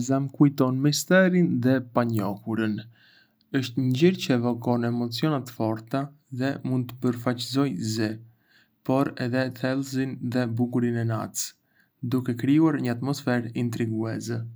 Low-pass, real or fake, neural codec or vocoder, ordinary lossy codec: none; real; none; none